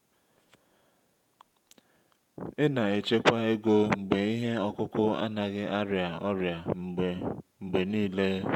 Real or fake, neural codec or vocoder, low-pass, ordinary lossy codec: fake; vocoder, 48 kHz, 128 mel bands, Vocos; 19.8 kHz; none